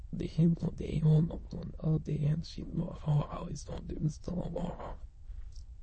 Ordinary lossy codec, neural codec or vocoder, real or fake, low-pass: MP3, 32 kbps; autoencoder, 22.05 kHz, a latent of 192 numbers a frame, VITS, trained on many speakers; fake; 9.9 kHz